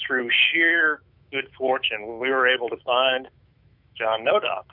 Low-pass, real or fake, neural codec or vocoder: 5.4 kHz; fake; autoencoder, 48 kHz, 128 numbers a frame, DAC-VAE, trained on Japanese speech